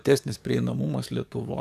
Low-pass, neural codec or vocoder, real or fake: 14.4 kHz; codec, 44.1 kHz, 7.8 kbps, DAC; fake